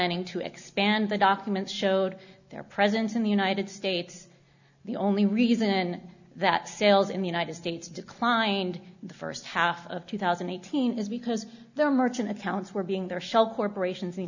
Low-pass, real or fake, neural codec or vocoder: 7.2 kHz; real; none